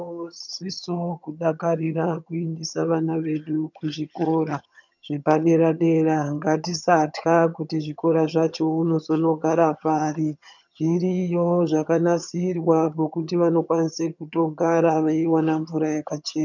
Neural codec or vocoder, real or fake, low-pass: vocoder, 22.05 kHz, 80 mel bands, HiFi-GAN; fake; 7.2 kHz